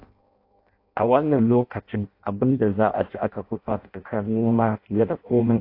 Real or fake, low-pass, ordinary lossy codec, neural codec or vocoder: fake; 5.4 kHz; AAC, 32 kbps; codec, 16 kHz in and 24 kHz out, 0.6 kbps, FireRedTTS-2 codec